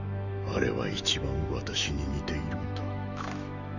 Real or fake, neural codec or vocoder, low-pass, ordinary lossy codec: fake; autoencoder, 48 kHz, 128 numbers a frame, DAC-VAE, trained on Japanese speech; 7.2 kHz; none